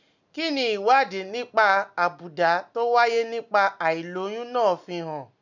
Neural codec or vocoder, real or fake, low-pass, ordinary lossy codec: none; real; 7.2 kHz; none